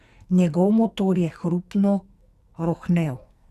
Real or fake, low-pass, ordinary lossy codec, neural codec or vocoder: fake; 14.4 kHz; Opus, 64 kbps; codec, 44.1 kHz, 2.6 kbps, SNAC